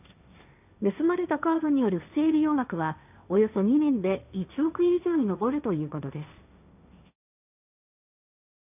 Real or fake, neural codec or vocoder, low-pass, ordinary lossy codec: fake; codec, 16 kHz, 1.1 kbps, Voila-Tokenizer; 3.6 kHz; none